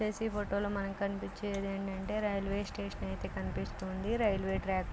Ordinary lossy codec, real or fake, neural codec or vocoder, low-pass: none; real; none; none